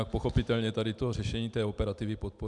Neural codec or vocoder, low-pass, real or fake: none; 10.8 kHz; real